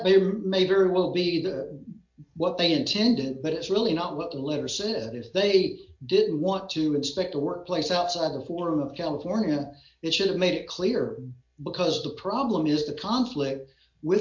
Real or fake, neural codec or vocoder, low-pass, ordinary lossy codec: real; none; 7.2 kHz; MP3, 48 kbps